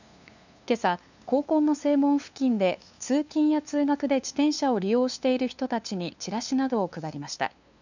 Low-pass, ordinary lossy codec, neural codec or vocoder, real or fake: 7.2 kHz; none; codec, 16 kHz, 2 kbps, FunCodec, trained on LibriTTS, 25 frames a second; fake